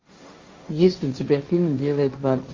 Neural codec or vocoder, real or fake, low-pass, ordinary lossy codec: codec, 16 kHz, 1.1 kbps, Voila-Tokenizer; fake; 7.2 kHz; Opus, 32 kbps